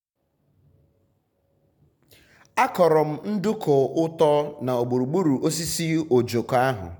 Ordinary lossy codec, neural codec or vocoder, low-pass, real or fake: none; none; 19.8 kHz; real